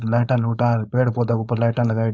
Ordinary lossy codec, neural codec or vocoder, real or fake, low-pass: none; codec, 16 kHz, 4.8 kbps, FACodec; fake; none